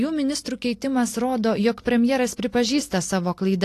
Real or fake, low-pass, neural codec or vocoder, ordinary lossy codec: real; 14.4 kHz; none; AAC, 48 kbps